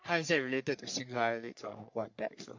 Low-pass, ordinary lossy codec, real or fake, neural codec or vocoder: 7.2 kHz; MP3, 48 kbps; fake; codec, 44.1 kHz, 3.4 kbps, Pupu-Codec